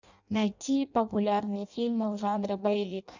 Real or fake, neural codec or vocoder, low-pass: fake; codec, 16 kHz in and 24 kHz out, 0.6 kbps, FireRedTTS-2 codec; 7.2 kHz